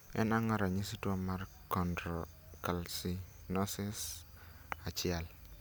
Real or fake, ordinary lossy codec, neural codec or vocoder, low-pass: real; none; none; none